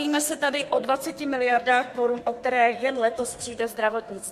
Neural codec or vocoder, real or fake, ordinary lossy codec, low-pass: codec, 32 kHz, 1.9 kbps, SNAC; fake; MP3, 64 kbps; 14.4 kHz